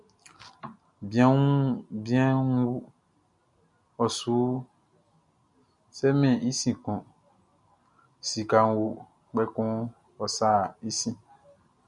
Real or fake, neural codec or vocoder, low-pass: real; none; 10.8 kHz